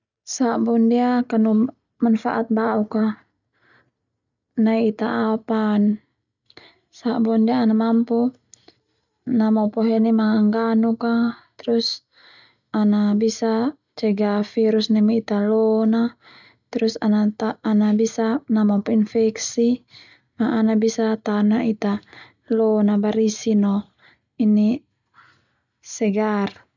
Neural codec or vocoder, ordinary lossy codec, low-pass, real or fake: none; none; 7.2 kHz; real